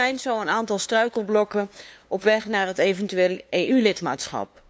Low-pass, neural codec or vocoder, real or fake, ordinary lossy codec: none; codec, 16 kHz, 2 kbps, FunCodec, trained on LibriTTS, 25 frames a second; fake; none